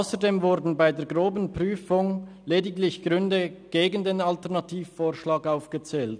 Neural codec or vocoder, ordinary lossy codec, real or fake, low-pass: none; none; real; 9.9 kHz